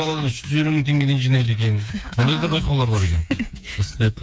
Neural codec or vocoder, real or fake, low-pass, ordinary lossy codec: codec, 16 kHz, 4 kbps, FreqCodec, smaller model; fake; none; none